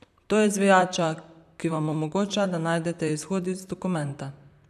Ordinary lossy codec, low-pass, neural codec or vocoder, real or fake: none; 14.4 kHz; vocoder, 44.1 kHz, 128 mel bands, Pupu-Vocoder; fake